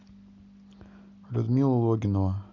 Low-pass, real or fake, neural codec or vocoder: 7.2 kHz; real; none